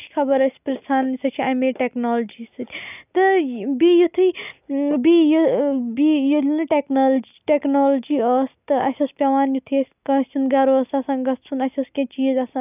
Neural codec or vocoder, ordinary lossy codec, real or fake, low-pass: none; none; real; 3.6 kHz